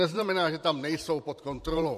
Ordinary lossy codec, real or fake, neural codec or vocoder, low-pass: MP3, 64 kbps; fake; vocoder, 44.1 kHz, 128 mel bands every 512 samples, BigVGAN v2; 14.4 kHz